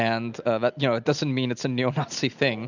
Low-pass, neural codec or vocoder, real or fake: 7.2 kHz; none; real